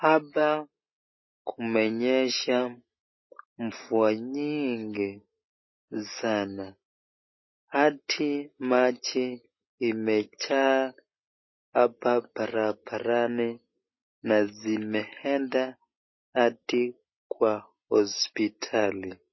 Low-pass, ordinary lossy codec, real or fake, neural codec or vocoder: 7.2 kHz; MP3, 24 kbps; real; none